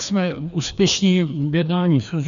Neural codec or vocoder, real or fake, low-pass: codec, 16 kHz, 2 kbps, FreqCodec, larger model; fake; 7.2 kHz